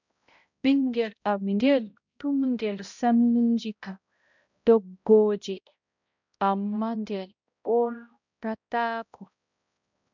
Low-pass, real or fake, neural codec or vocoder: 7.2 kHz; fake; codec, 16 kHz, 0.5 kbps, X-Codec, HuBERT features, trained on balanced general audio